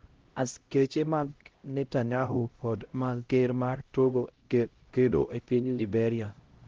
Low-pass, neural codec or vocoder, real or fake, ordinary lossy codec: 7.2 kHz; codec, 16 kHz, 0.5 kbps, X-Codec, HuBERT features, trained on LibriSpeech; fake; Opus, 16 kbps